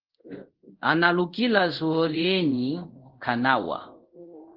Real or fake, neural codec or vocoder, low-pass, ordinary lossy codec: fake; codec, 24 kHz, 0.5 kbps, DualCodec; 5.4 kHz; Opus, 16 kbps